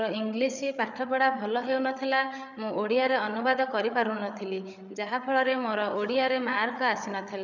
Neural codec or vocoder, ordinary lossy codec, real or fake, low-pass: codec, 16 kHz, 8 kbps, FreqCodec, larger model; none; fake; 7.2 kHz